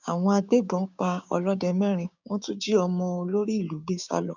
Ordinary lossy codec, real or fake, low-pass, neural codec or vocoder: none; fake; 7.2 kHz; codec, 16 kHz, 6 kbps, DAC